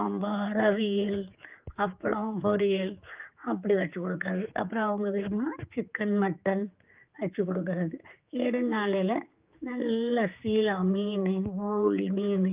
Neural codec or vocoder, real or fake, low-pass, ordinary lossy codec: codec, 16 kHz, 4 kbps, X-Codec, HuBERT features, trained on general audio; fake; 3.6 kHz; Opus, 24 kbps